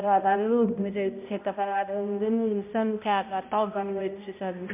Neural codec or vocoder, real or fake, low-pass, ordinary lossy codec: codec, 16 kHz, 0.5 kbps, X-Codec, HuBERT features, trained on balanced general audio; fake; 3.6 kHz; MP3, 32 kbps